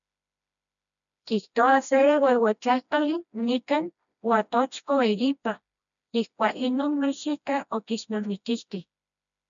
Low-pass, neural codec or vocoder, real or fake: 7.2 kHz; codec, 16 kHz, 1 kbps, FreqCodec, smaller model; fake